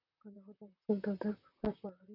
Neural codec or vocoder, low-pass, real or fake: vocoder, 44.1 kHz, 128 mel bands, Pupu-Vocoder; 5.4 kHz; fake